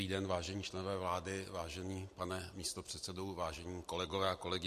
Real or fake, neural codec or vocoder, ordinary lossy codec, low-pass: real; none; MP3, 64 kbps; 14.4 kHz